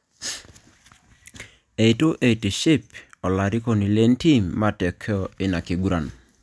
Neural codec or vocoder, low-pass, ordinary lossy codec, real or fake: none; none; none; real